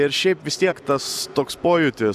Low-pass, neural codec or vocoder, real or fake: 14.4 kHz; none; real